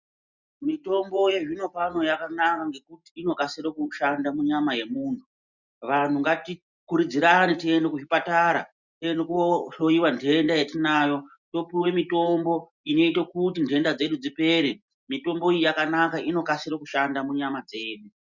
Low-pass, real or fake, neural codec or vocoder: 7.2 kHz; real; none